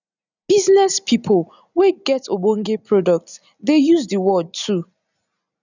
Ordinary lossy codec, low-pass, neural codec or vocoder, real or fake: none; 7.2 kHz; none; real